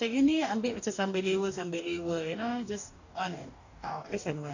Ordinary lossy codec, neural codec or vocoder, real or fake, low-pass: none; codec, 44.1 kHz, 2.6 kbps, DAC; fake; 7.2 kHz